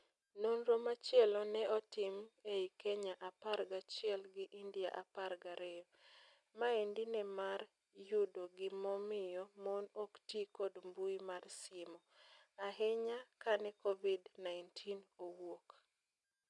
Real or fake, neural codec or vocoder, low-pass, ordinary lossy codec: real; none; 10.8 kHz; none